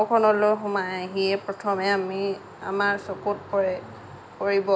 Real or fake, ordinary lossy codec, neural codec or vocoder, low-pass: real; none; none; none